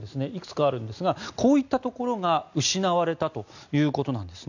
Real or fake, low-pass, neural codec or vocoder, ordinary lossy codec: real; 7.2 kHz; none; none